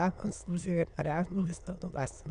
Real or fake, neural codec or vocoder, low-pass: fake; autoencoder, 22.05 kHz, a latent of 192 numbers a frame, VITS, trained on many speakers; 9.9 kHz